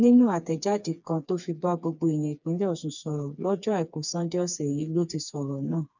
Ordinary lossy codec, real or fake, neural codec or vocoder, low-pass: none; fake; codec, 16 kHz, 4 kbps, FreqCodec, smaller model; 7.2 kHz